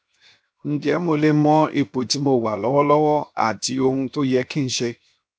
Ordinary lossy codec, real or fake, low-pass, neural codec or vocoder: none; fake; none; codec, 16 kHz, 0.7 kbps, FocalCodec